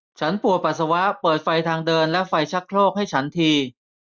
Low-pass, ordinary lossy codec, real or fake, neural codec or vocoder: none; none; real; none